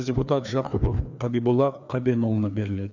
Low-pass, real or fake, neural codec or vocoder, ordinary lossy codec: 7.2 kHz; fake; codec, 16 kHz, 2 kbps, FreqCodec, larger model; none